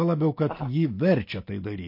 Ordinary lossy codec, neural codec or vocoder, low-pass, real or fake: MP3, 32 kbps; none; 5.4 kHz; real